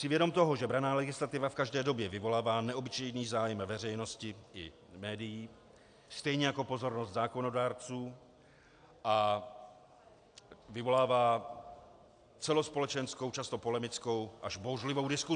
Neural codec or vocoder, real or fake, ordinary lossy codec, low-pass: none; real; AAC, 64 kbps; 9.9 kHz